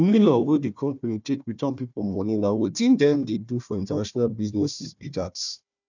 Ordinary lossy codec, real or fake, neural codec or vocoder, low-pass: none; fake; codec, 16 kHz, 1 kbps, FunCodec, trained on Chinese and English, 50 frames a second; 7.2 kHz